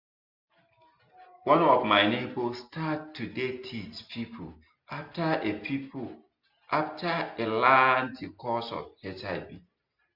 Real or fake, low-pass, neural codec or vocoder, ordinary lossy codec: real; 5.4 kHz; none; MP3, 48 kbps